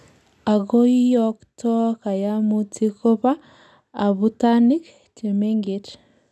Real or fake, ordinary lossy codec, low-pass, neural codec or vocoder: real; none; none; none